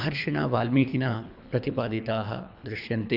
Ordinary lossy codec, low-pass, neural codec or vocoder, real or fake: none; 5.4 kHz; codec, 24 kHz, 3 kbps, HILCodec; fake